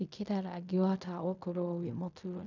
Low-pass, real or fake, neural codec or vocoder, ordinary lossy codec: 7.2 kHz; fake; codec, 16 kHz in and 24 kHz out, 0.4 kbps, LongCat-Audio-Codec, fine tuned four codebook decoder; none